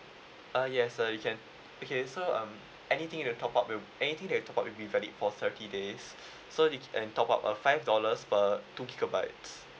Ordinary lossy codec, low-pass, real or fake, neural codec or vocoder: none; none; real; none